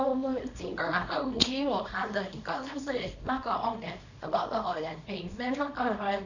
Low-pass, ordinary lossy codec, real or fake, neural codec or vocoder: 7.2 kHz; none; fake; codec, 24 kHz, 0.9 kbps, WavTokenizer, small release